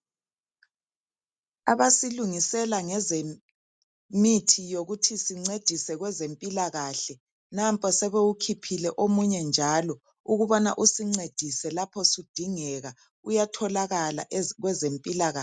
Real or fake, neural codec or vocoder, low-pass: real; none; 9.9 kHz